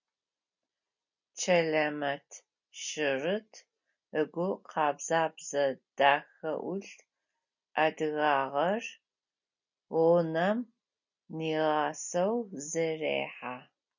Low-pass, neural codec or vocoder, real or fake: 7.2 kHz; none; real